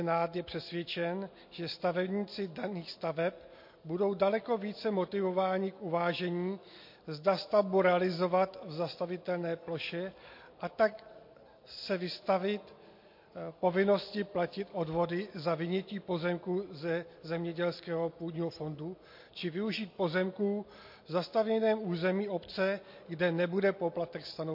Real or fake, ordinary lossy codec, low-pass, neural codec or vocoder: real; MP3, 32 kbps; 5.4 kHz; none